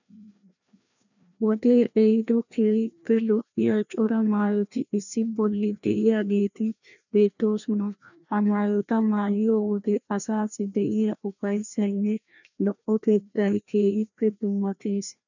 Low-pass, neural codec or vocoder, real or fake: 7.2 kHz; codec, 16 kHz, 1 kbps, FreqCodec, larger model; fake